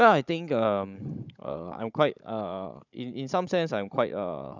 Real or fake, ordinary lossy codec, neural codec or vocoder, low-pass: fake; none; codec, 16 kHz, 16 kbps, FunCodec, trained on Chinese and English, 50 frames a second; 7.2 kHz